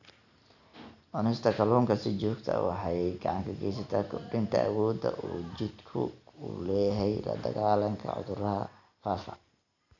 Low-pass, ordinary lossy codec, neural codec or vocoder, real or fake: 7.2 kHz; none; none; real